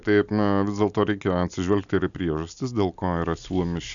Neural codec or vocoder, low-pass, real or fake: none; 7.2 kHz; real